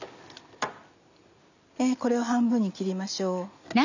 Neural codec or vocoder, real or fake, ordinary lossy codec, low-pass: none; real; none; 7.2 kHz